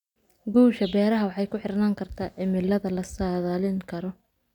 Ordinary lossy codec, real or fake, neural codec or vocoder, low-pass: none; real; none; 19.8 kHz